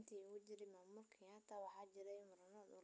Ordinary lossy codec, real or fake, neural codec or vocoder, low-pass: none; real; none; none